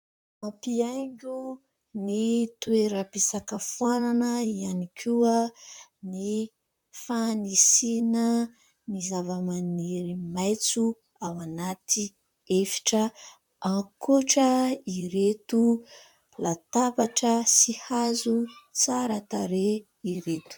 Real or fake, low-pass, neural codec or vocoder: fake; 19.8 kHz; vocoder, 44.1 kHz, 128 mel bands, Pupu-Vocoder